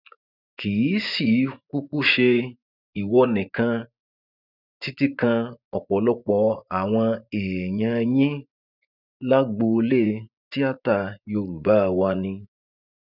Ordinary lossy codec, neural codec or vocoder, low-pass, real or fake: none; vocoder, 24 kHz, 100 mel bands, Vocos; 5.4 kHz; fake